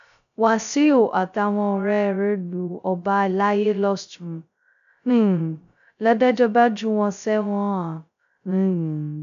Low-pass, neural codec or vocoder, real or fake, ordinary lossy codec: 7.2 kHz; codec, 16 kHz, 0.2 kbps, FocalCodec; fake; none